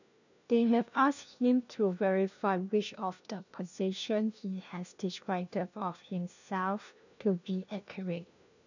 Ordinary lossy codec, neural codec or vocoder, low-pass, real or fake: none; codec, 16 kHz, 1 kbps, FunCodec, trained on LibriTTS, 50 frames a second; 7.2 kHz; fake